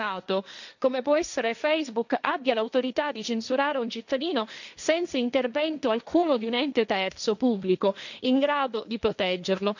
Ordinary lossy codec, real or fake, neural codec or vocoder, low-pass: none; fake; codec, 16 kHz, 1.1 kbps, Voila-Tokenizer; 7.2 kHz